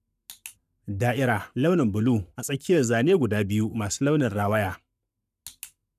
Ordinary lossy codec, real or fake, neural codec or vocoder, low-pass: none; fake; codec, 44.1 kHz, 7.8 kbps, Pupu-Codec; 14.4 kHz